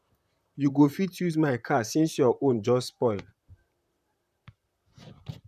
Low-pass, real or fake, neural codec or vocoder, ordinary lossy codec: 14.4 kHz; fake; vocoder, 44.1 kHz, 128 mel bands, Pupu-Vocoder; none